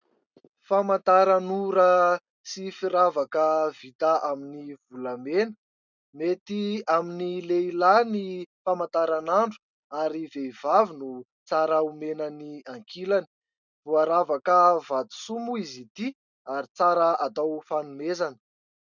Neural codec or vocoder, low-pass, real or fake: none; 7.2 kHz; real